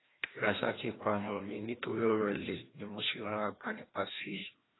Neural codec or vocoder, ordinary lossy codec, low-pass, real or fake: codec, 16 kHz, 1 kbps, FreqCodec, larger model; AAC, 16 kbps; 7.2 kHz; fake